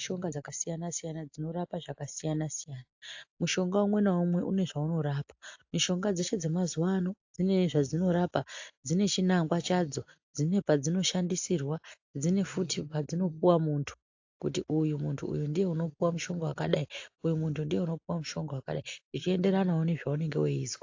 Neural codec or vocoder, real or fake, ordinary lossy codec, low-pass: none; real; AAC, 48 kbps; 7.2 kHz